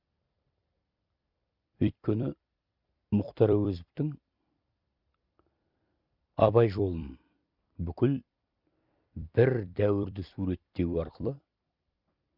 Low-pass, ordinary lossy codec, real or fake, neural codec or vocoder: 5.4 kHz; Opus, 64 kbps; fake; vocoder, 44.1 kHz, 128 mel bands every 256 samples, BigVGAN v2